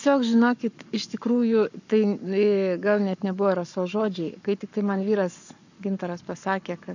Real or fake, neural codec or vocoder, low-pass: fake; codec, 44.1 kHz, 7.8 kbps, Pupu-Codec; 7.2 kHz